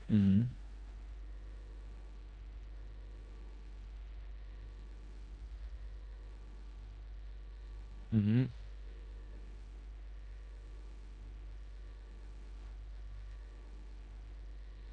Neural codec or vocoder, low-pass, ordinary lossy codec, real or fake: codec, 16 kHz in and 24 kHz out, 0.9 kbps, LongCat-Audio-Codec, four codebook decoder; 9.9 kHz; none; fake